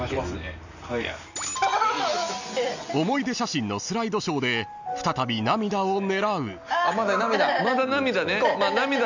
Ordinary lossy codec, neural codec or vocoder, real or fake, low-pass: none; none; real; 7.2 kHz